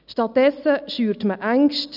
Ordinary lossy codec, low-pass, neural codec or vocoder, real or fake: none; 5.4 kHz; none; real